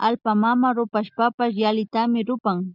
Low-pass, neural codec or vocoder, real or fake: 5.4 kHz; none; real